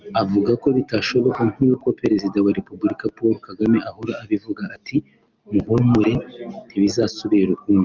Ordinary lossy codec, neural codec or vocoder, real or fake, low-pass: Opus, 24 kbps; none; real; 7.2 kHz